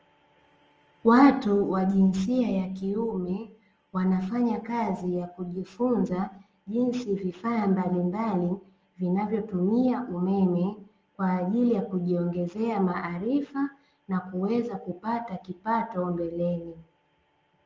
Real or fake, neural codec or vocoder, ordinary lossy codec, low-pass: real; none; Opus, 24 kbps; 7.2 kHz